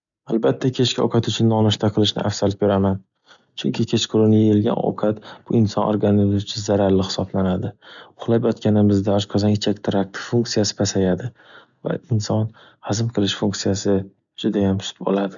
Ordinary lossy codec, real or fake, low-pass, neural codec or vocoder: none; real; 7.2 kHz; none